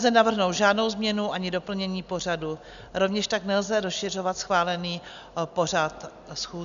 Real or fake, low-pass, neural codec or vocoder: real; 7.2 kHz; none